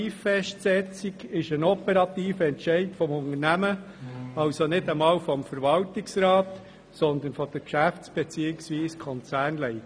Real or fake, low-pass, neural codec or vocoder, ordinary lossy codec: real; none; none; none